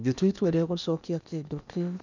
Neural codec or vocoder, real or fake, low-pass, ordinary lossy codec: codec, 16 kHz in and 24 kHz out, 0.8 kbps, FocalCodec, streaming, 65536 codes; fake; 7.2 kHz; none